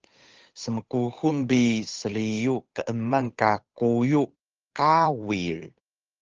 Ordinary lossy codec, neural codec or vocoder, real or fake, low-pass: Opus, 24 kbps; codec, 16 kHz, 8 kbps, FunCodec, trained on Chinese and English, 25 frames a second; fake; 7.2 kHz